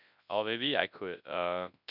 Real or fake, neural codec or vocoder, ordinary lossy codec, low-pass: fake; codec, 24 kHz, 0.9 kbps, WavTokenizer, large speech release; none; 5.4 kHz